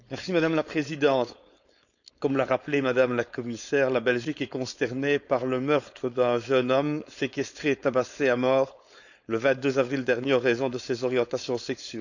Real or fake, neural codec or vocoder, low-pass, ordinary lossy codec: fake; codec, 16 kHz, 4.8 kbps, FACodec; 7.2 kHz; none